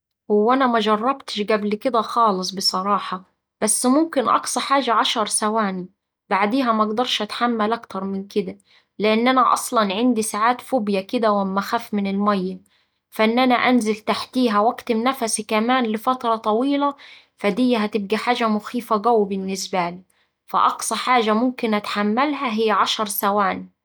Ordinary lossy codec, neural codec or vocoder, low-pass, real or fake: none; none; none; real